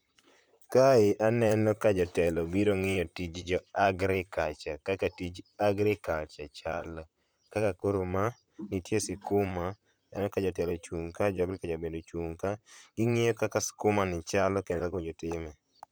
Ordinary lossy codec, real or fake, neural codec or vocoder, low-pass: none; fake; vocoder, 44.1 kHz, 128 mel bands, Pupu-Vocoder; none